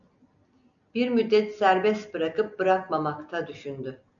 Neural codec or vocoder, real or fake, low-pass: none; real; 7.2 kHz